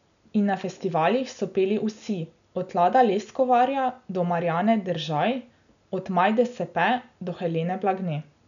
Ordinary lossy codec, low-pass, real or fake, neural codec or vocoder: none; 7.2 kHz; real; none